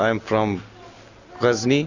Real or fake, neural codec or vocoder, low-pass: real; none; 7.2 kHz